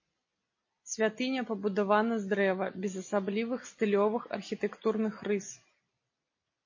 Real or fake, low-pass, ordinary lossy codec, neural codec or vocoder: real; 7.2 kHz; MP3, 32 kbps; none